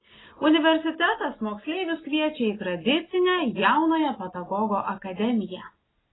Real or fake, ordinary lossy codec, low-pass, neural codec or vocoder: real; AAC, 16 kbps; 7.2 kHz; none